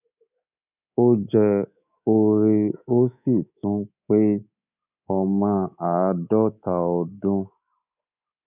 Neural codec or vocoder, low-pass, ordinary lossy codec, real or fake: codec, 24 kHz, 3.1 kbps, DualCodec; 3.6 kHz; none; fake